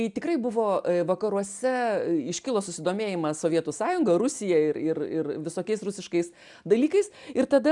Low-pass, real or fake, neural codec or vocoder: 10.8 kHz; real; none